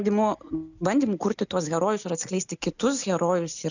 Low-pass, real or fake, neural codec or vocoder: 7.2 kHz; real; none